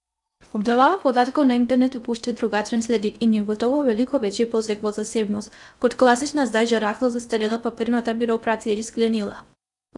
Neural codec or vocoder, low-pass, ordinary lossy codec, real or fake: codec, 16 kHz in and 24 kHz out, 0.6 kbps, FocalCodec, streaming, 4096 codes; 10.8 kHz; none; fake